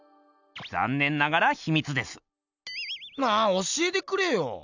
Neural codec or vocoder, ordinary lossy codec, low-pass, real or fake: none; none; 7.2 kHz; real